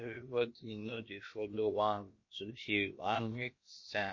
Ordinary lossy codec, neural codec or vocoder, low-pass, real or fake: MP3, 32 kbps; codec, 16 kHz, about 1 kbps, DyCAST, with the encoder's durations; 7.2 kHz; fake